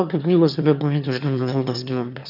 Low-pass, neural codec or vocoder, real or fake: 5.4 kHz; autoencoder, 22.05 kHz, a latent of 192 numbers a frame, VITS, trained on one speaker; fake